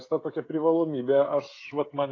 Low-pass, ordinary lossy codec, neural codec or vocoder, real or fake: 7.2 kHz; AAC, 32 kbps; codec, 16 kHz, 16 kbps, FreqCodec, smaller model; fake